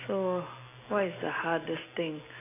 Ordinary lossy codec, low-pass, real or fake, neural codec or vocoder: AAC, 16 kbps; 3.6 kHz; real; none